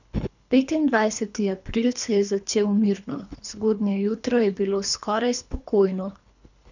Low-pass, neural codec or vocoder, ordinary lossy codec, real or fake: 7.2 kHz; codec, 24 kHz, 3 kbps, HILCodec; none; fake